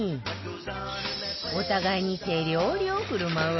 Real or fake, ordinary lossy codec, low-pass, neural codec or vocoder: real; MP3, 24 kbps; 7.2 kHz; none